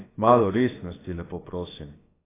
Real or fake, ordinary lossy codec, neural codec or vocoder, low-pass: fake; AAC, 16 kbps; codec, 16 kHz, about 1 kbps, DyCAST, with the encoder's durations; 3.6 kHz